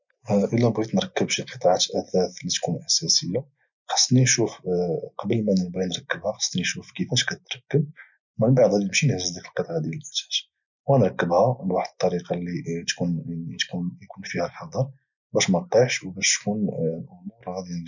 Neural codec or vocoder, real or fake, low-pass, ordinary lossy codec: none; real; 7.2 kHz; none